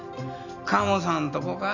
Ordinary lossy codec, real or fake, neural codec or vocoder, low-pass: none; real; none; 7.2 kHz